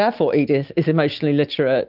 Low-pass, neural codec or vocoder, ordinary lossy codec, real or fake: 5.4 kHz; none; Opus, 32 kbps; real